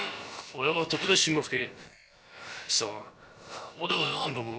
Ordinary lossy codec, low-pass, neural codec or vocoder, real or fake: none; none; codec, 16 kHz, about 1 kbps, DyCAST, with the encoder's durations; fake